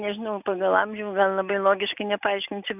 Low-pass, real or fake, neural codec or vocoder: 3.6 kHz; real; none